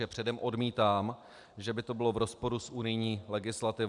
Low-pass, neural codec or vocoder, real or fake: 10.8 kHz; none; real